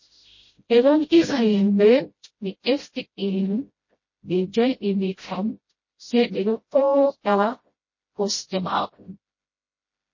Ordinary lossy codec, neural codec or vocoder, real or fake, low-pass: MP3, 32 kbps; codec, 16 kHz, 0.5 kbps, FreqCodec, smaller model; fake; 7.2 kHz